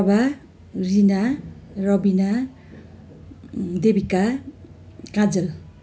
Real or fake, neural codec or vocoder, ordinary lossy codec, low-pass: real; none; none; none